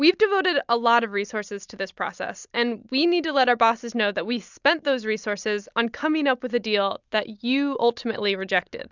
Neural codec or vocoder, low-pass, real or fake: none; 7.2 kHz; real